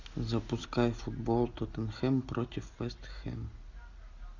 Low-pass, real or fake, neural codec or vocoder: 7.2 kHz; real; none